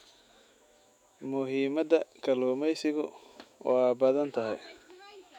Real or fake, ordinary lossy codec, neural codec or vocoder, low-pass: fake; none; autoencoder, 48 kHz, 128 numbers a frame, DAC-VAE, trained on Japanese speech; 19.8 kHz